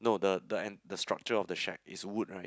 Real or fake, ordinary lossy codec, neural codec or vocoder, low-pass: real; none; none; none